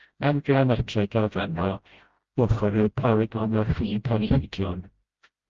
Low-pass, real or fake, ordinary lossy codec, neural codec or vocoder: 7.2 kHz; fake; Opus, 32 kbps; codec, 16 kHz, 0.5 kbps, FreqCodec, smaller model